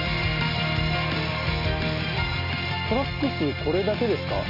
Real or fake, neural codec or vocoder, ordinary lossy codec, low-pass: real; none; none; 5.4 kHz